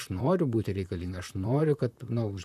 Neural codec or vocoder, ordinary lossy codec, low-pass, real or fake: vocoder, 44.1 kHz, 128 mel bands, Pupu-Vocoder; AAC, 96 kbps; 14.4 kHz; fake